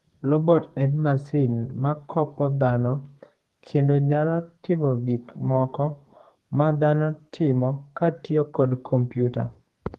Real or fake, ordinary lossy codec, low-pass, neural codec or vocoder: fake; Opus, 24 kbps; 14.4 kHz; codec, 32 kHz, 1.9 kbps, SNAC